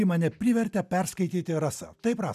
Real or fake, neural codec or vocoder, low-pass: real; none; 14.4 kHz